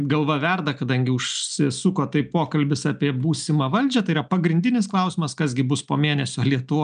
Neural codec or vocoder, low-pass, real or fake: none; 9.9 kHz; real